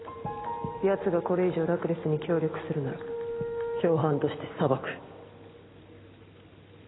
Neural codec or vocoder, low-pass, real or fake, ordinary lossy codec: none; 7.2 kHz; real; AAC, 16 kbps